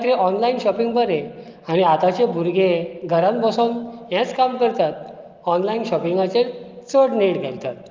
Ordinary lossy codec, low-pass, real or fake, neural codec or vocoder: Opus, 32 kbps; 7.2 kHz; real; none